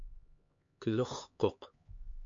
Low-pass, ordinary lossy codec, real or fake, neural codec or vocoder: 7.2 kHz; MP3, 48 kbps; fake; codec, 16 kHz, 4 kbps, X-Codec, HuBERT features, trained on LibriSpeech